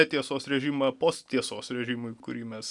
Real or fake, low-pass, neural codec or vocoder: real; 10.8 kHz; none